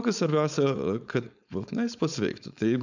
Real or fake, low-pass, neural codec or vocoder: fake; 7.2 kHz; codec, 16 kHz, 4.8 kbps, FACodec